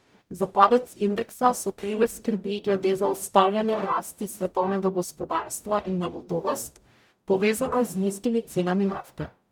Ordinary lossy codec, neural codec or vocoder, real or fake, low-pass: none; codec, 44.1 kHz, 0.9 kbps, DAC; fake; none